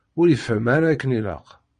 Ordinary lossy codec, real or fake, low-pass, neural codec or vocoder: MP3, 48 kbps; fake; 9.9 kHz; vocoder, 22.05 kHz, 80 mel bands, Vocos